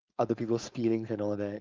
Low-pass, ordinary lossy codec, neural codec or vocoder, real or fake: 7.2 kHz; Opus, 32 kbps; codec, 16 kHz, 4.8 kbps, FACodec; fake